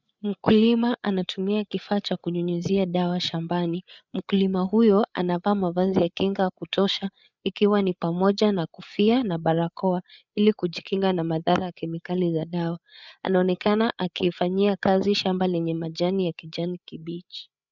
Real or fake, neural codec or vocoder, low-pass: fake; codec, 16 kHz, 8 kbps, FreqCodec, larger model; 7.2 kHz